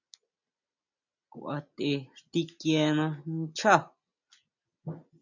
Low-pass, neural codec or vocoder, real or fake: 7.2 kHz; none; real